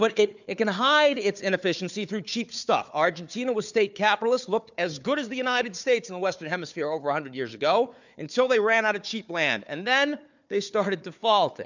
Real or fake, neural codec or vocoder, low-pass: fake; codec, 16 kHz, 4 kbps, FunCodec, trained on Chinese and English, 50 frames a second; 7.2 kHz